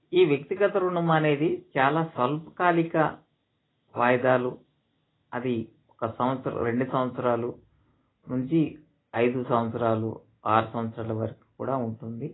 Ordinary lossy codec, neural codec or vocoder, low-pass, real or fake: AAC, 16 kbps; none; 7.2 kHz; real